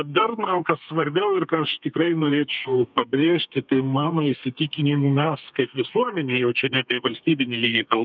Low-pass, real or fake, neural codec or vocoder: 7.2 kHz; fake; codec, 32 kHz, 1.9 kbps, SNAC